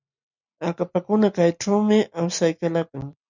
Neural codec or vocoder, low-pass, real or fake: none; 7.2 kHz; real